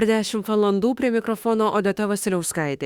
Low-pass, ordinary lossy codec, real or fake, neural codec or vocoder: 19.8 kHz; Opus, 64 kbps; fake; autoencoder, 48 kHz, 32 numbers a frame, DAC-VAE, trained on Japanese speech